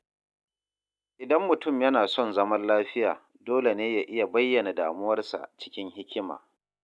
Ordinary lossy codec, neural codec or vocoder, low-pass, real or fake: none; none; none; real